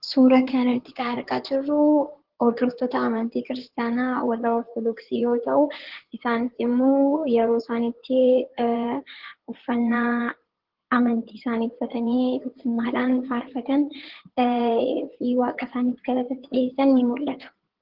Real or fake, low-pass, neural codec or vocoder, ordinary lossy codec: fake; 5.4 kHz; codec, 16 kHz in and 24 kHz out, 2.2 kbps, FireRedTTS-2 codec; Opus, 16 kbps